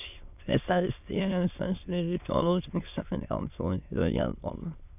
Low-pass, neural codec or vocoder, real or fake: 3.6 kHz; autoencoder, 22.05 kHz, a latent of 192 numbers a frame, VITS, trained on many speakers; fake